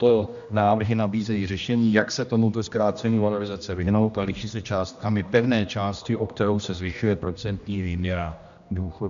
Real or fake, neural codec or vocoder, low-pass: fake; codec, 16 kHz, 1 kbps, X-Codec, HuBERT features, trained on general audio; 7.2 kHz